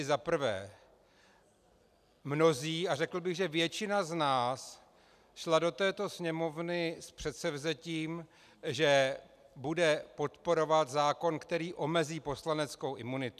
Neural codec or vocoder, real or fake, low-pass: none; real; 14.4 kHz